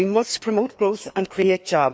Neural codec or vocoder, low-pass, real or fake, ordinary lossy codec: codec, 16 kHz, 2 kbps, FreqCodec, larger model; none; fake; none